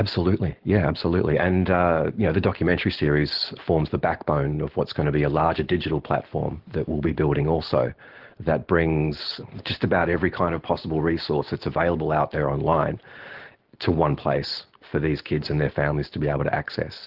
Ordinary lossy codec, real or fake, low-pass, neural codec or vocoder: Opus, 16 kbps; real; 5.4 kHz; none